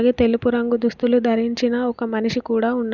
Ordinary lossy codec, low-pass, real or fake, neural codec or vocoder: none; 7.2 kHz; real; none